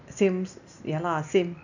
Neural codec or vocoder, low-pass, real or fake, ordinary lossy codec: none; 7.2 kHz; real; none